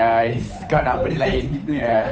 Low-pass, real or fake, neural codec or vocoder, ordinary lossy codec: none; fake; codec, 16 kHz, 8 kbps, FunCodec, trained on Chinese and English, 25 frames a second; none